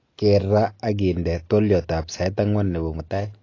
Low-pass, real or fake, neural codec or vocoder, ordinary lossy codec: 7.2 kHz; real; none; AAC, 32 kbps